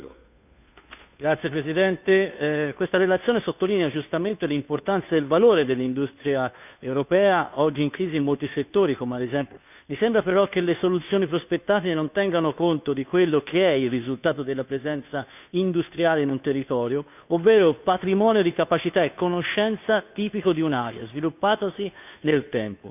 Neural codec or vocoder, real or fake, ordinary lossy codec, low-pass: codec, 16 kHz, 2 kbps, FunCodec, trained on Chinese and English, 25 frames a second; fake; none; 3.6 kHz